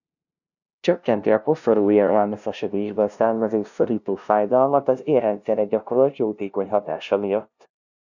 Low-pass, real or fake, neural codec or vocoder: 7.2 kHz; fake; codec, 16 kHz, 0.5 kbps, FunCodec, trained on LibriTTS, 25 frames a second